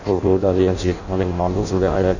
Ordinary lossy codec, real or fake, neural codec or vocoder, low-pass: none; fake; codec, 16 kHz in and 24 kHz out, 0.6 kbps, FireRedTTS-2 codec; 7.2 kHz